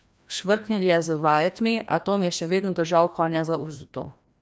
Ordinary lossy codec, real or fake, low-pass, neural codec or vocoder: none; fake; none; codec, 16 kHz, 1 kbps, FreqCodec, larger model